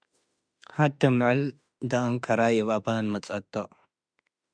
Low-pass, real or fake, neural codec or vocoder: 9.9 kHz; fake; autoencoder, 48 kHz, 32 numbers a frame, DAC-VAE, trained on Japanese speech